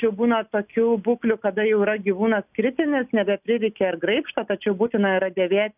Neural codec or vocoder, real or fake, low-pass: none; real; 3.6 kHz